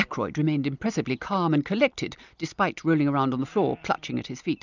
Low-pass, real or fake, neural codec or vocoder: 7.2 kHz; real; none